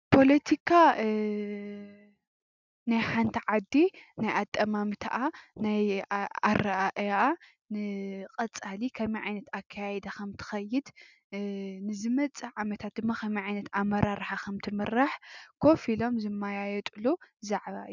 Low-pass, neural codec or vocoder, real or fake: 7.2 kHz; none; real